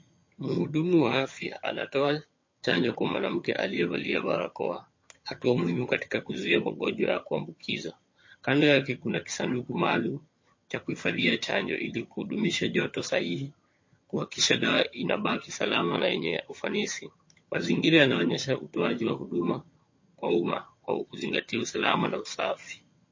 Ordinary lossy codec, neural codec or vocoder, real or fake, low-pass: MP3, 32 kbps; vocoder, 22.05 kHz, 80 mel bands, HiFi-GAN; fake; 7.2 kHz